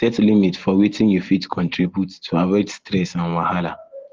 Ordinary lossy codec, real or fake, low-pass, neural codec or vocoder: Opus, 16 kbps; real; 7.2 kHz; none